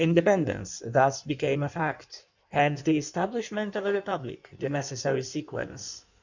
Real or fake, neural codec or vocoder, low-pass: fake; codec, 16 kHz in and 24 kHz out, 1.1 kbps, FireRedTTS-2 codec; 7.2 kHz